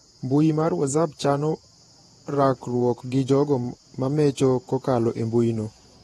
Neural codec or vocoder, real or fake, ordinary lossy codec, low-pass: vocoder, 48 kHz, 128 mel bands, Vocos; fake; AAC, 32 kbps; 19.8 kHz